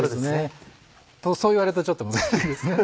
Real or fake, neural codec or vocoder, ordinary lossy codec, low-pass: real; none; none; none